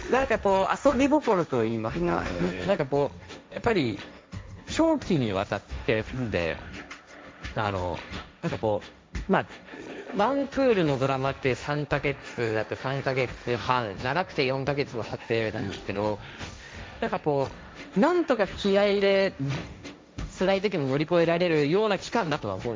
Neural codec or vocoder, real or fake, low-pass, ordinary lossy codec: codec, 16 kHz, 1.1 kbps, Voila-Tokenizer; fake; none; none